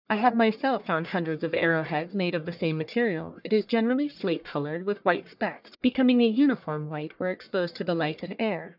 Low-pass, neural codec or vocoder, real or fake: 5.4 kHz; codec, 44.1 kHz, 1.7 kbps, Pupu-Codec; fake